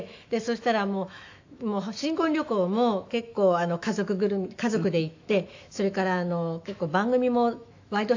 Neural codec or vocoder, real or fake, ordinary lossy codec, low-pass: autoencoder, 48 kHz, 128 numbers a frame, DAC-VAE, trained on Japanese speech; fake; AAC, 48 kbps; 7.2 kHz